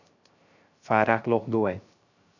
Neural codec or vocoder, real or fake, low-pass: codec, 16 kHz, 0.3 kbps, FocalCodec; fake; 7.2 kHz